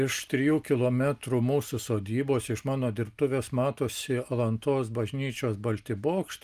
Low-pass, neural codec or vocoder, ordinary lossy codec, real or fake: 14.4 kHz; none; Opus, 32 kbps; real